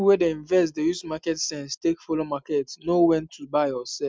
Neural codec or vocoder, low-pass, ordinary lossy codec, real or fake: none; none; none; real